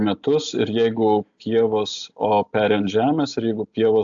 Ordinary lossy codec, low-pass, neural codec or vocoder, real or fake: AAC, 64 kbps; 7.2 kHz; none; real